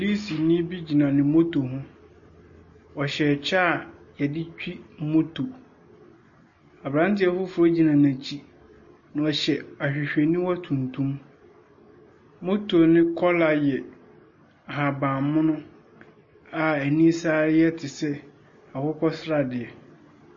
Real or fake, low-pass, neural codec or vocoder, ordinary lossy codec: real; 7.2 kHz; none; MP3, 32 kbps